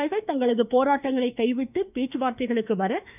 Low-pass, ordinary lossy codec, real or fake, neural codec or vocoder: 3.6 kHz; none; fake; autoencoder, 48 kHz, 32 numbers a frame, DAC-VAE, trained on Japanese speech